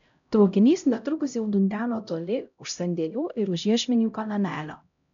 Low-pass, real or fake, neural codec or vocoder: 7.2 kHz; fake; codec, 16 kHz, 0.5 kbps, X-Codec, HuBERT features, trained on LibriSpeech